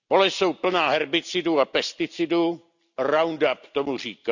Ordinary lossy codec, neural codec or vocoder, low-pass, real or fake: none; none; 7.2 kHz; real